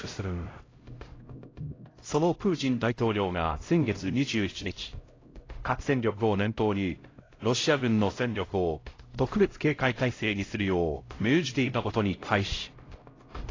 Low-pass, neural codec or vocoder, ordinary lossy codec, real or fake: 7.2 kHz; codec, 16 kHz, 0.5 kbps, X-Codec, HuBERT features, trained on LibriSpeech; AAC, 32 kbps; fake